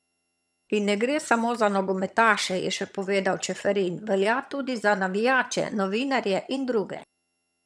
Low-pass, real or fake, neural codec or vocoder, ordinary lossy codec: none; fake; vocoder, 22.05 kHz, 80 mel bands, HiFi-GAN; none